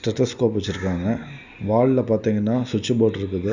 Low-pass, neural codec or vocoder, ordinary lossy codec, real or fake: 7.2 kHz; none; Opus, 64 kbps; real